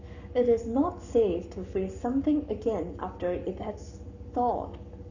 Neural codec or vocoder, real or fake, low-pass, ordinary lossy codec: codec, 44.1 kHz, 7.8 kbps, Pupu-Codec; fake; 7.2 kHz; none